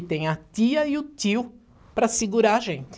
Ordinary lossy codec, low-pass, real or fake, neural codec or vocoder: none; none; real; none